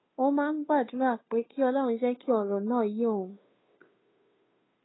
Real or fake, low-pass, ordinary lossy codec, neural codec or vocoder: fake; 7.2 kHz; AAC, 16 kbps; codec, 16 kHz, 2 kbps, FunCodec, trained on Chinese and English, 25 frames a second